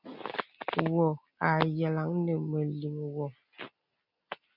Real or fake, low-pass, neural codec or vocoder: real; 5.4 kHz; none